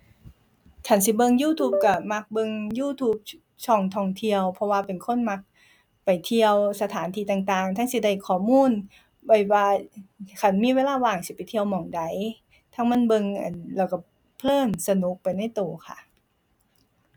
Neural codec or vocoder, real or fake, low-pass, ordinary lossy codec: none; real; 19.8 kHz; none